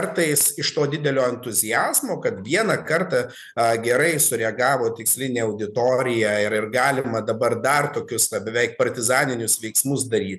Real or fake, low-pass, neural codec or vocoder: real; 14.4 kHz; none